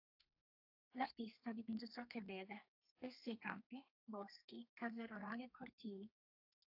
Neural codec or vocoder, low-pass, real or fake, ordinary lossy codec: codec, 32 kHz, 1.9 kbps, SNAC; 5.4 kHz; fake; AAC, 48 kbps